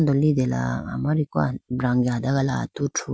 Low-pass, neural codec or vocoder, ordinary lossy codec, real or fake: none; none; none; real